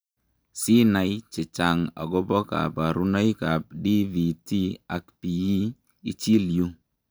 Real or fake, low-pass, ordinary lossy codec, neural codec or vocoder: real; none; none; none